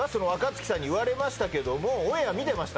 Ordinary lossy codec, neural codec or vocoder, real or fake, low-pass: none; none; real; none